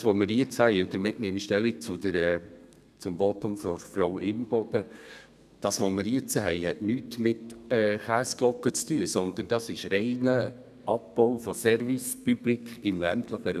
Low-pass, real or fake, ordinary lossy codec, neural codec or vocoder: 14.4 kHz; fake; none; codec, 32 kHz, 1.9 kbps, SNAC